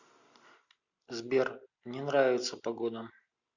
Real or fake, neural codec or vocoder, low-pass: real; none; 7.2 kHz